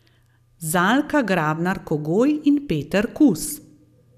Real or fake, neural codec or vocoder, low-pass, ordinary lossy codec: real; none; 14.4 kHz; none